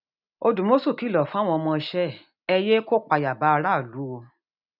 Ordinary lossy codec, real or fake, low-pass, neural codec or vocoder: none; real; 5.4 kHz; none